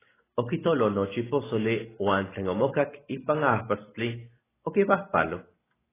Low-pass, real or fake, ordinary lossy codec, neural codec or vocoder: 3.6 kHz; real; AAC, 16 kbps; none